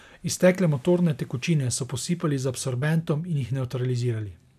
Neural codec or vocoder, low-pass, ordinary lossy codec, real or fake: none; 14.4 kHz; none; real